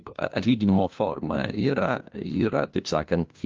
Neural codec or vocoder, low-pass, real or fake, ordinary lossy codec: codec, 16 kHz, 1 kbps, FunCodec, trained on LibriTTS, 50 frames a second; 7.2 kHz; fake; Opus, 24 kbps